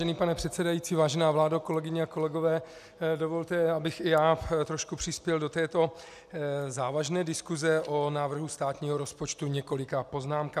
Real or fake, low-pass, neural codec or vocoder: real; 14.4 kHz; none